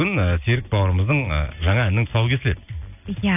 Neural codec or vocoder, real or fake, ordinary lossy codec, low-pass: none; real; none; 3.6 kHz